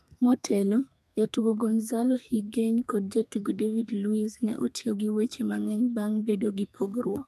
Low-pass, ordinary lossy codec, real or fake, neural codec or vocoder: 14.4 kHz; none; fake; codec, 32 kHz, 1.9 kbps, SNAC